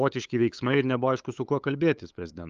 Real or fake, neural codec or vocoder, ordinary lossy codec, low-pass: fake; codec, 16 kHz, 8 kbps, FunCodec, trained on LibriTTS, 25 frames a second; Opus, 32 kbps; 7.2 kHz